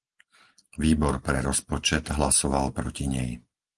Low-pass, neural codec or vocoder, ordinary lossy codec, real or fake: 10.8 kHz; none; Opus, 24 kbps; real